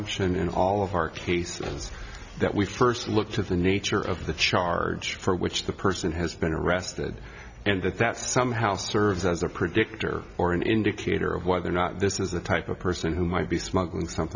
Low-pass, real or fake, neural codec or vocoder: 7.2 kHz; real; none